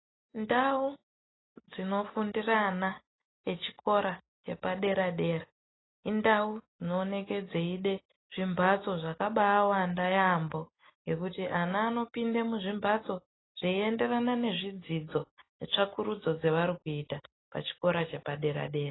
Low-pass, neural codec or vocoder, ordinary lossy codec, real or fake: 7.2 kHz; none; AAC, 16 kbps; real